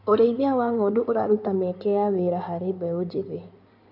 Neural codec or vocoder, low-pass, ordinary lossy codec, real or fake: codec, 16 kHz in and 24 kHz out, 2.2 kbps, FireRedTTS-2 codec; 5.4 kHz; none; fake